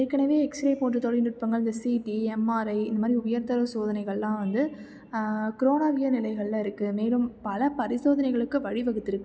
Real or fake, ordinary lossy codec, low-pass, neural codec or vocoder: real; none; none; none